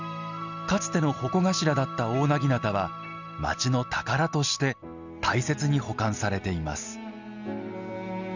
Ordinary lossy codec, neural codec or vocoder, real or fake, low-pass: none; none; real; 7.2 kHz